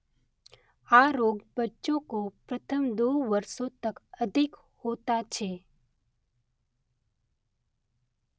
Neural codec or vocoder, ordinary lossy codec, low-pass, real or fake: none; none; none; real